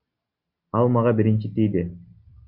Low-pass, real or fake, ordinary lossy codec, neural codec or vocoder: 5.4 kHz; real; Opus, 64 kbps; none